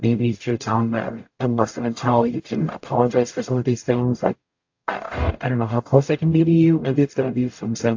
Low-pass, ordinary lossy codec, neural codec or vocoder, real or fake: 7.2 kHz; AAC, 48 kbps; codec, 44.1 kHz, 0.9 kbps, DAC; fake